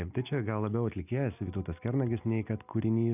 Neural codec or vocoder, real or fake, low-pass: none; real; 3.6 kHz